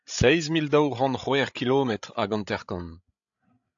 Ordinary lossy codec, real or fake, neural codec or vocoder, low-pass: AAC, 64 kbps; fake; codec, 16 kHz, 16 kbps, FreqCodec, larger model; 7.2 kHz